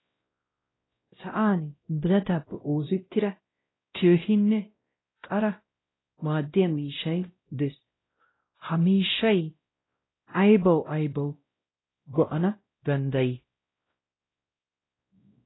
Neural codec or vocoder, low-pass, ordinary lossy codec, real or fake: codec, 16 kHz, 0.5 kbps, X-Codec, WavLM features, trained on Multilingual LibriSpeech; 7.2 kHz; AAC, 16 kbps; fake